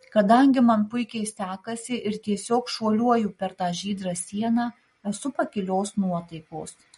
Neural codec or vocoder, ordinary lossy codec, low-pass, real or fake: none; MP3, 48 kbps; 19.8 kHz; real